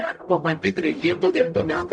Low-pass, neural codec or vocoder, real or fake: 9.9 kHz; codec, 44.1 kHz, 0.9 kbps, DAC; fake